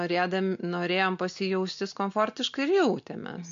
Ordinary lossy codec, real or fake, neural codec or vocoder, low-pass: MP3, 48 kbps; real; none; 7.2 kHz